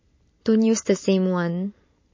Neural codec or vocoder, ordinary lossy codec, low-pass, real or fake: none; MP3, 32 kbps; 7.2 kHz; real